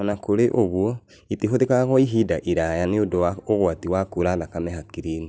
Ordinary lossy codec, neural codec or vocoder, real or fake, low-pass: none; none; real; none